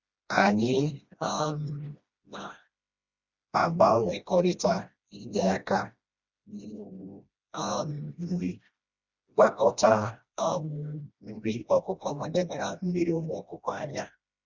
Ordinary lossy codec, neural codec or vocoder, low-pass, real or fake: Opus, 64 kbps; codec, 16 kHz, 1 kbps, FreqCodec, smaller model; 7.2 kHz; fake